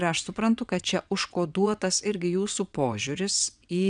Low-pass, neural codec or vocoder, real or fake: 9.9 kHz; vocoder, 22.05 kHz, 80 mel bands, WaveNeXt; fake